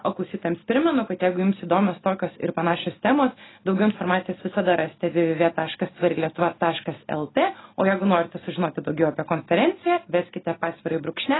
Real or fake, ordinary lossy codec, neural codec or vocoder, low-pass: real; AAC, 16 kbps; none; 7.2 kHz